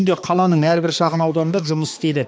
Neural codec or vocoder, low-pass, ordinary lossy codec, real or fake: codec, 16 kHz, 2 kbps, X-Codec, HuBERT features, trained on balanced general audio; none; none; fake